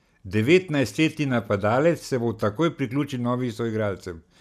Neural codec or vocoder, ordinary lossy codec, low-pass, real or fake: none; none; 14.4 kHz; real